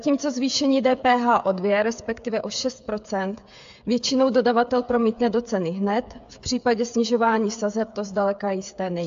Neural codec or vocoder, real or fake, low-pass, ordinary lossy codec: codec, 16 kHz, 8 kbps, FreqCodec, smaller model; fake; 7.2 kHz; AAC, 64 kbps